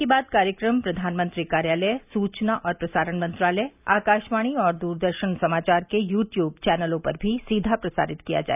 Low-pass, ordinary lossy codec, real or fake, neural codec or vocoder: 3.6 kHz; none; real; none